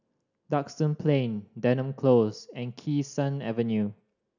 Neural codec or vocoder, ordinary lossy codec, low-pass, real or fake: none; none; 7.2 kHz; real